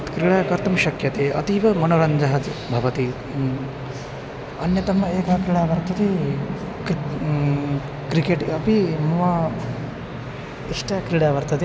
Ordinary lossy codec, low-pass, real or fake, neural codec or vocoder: none; none; real; none